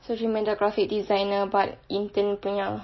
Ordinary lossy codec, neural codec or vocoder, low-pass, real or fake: MP3, 24 kbps; none; 7.2 kHz; real